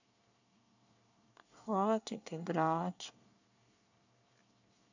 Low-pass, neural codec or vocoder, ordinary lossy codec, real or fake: 7.2 kHz; codec, 24 kHz, 1 kbps, SNAC; none; fake